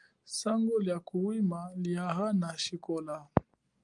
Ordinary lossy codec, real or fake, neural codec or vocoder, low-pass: Opus, 32 kbps; real; none; 10.8 kHz